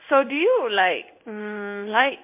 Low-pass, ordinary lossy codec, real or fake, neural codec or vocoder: 3.6 kHz; MP3, 32 kbps; fake; codec, 16 kHz in and 24 kHz out, 1 kbps, XY-Tokenizer